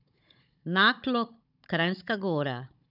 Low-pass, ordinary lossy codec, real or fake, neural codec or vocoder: 5.4 kHz; none; fake; codec, 16 kHz, 16 kbps, FunCodec, trained on Chinese and English, 50 frames a second